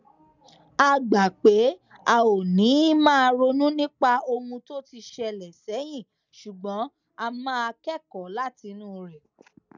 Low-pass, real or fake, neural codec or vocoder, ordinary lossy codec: 7.2 kHz; real; none; none